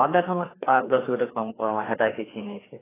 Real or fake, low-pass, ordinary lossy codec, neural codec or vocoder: fake; 3.6 kHz; AAC, 16 kbps; codec, 16 kHz, 2 kbps, FreqCodec, larger model